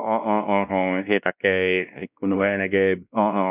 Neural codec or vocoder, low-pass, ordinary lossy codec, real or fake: codec, 16 kHz, 1 kbps, X-Codec, WavLM features, trained on Multilingual LibriSpeech; 3.6 kHz; none; fake